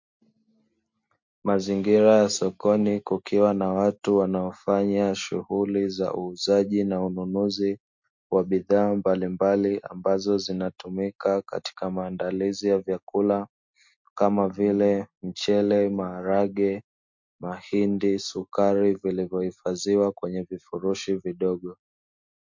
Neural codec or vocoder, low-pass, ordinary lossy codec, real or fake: none; 7.2 kHz; MP3, 48 kbps; real